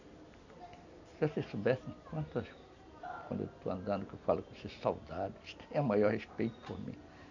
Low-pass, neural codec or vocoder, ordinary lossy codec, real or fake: 7.2 kHz; none; none; real